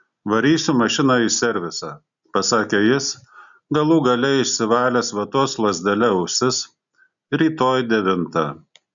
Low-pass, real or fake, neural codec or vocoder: 7.2 kHz; real; none